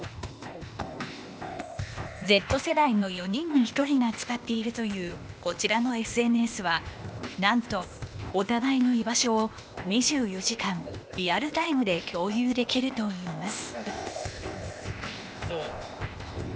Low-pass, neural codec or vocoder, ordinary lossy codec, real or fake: none; codec, 16 kHz, 0.8 kbps, ZipCodec; none; fake